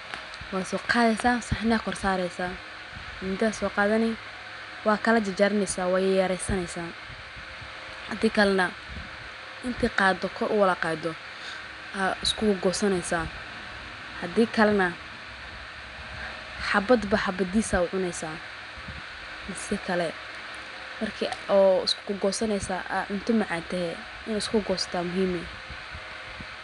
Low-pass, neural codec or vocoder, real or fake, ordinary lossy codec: 10.8 kHz; none; real; none